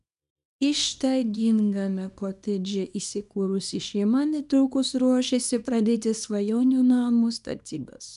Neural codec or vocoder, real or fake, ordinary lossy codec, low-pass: codec, 24 kHz, 0.9 kbps, WavTokenizer, small release; fake; MP3, 96 kbps; 10.8 kHz